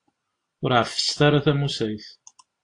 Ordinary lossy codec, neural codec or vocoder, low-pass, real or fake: AAC, 48 kbps; none; 9.9 kHz; real